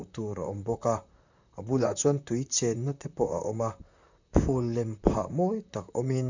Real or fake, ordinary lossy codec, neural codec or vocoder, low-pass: fake; none; vocoder, 44.1 kHz, 128 mel bands, Pupu-Vocoder; 7.2 kHz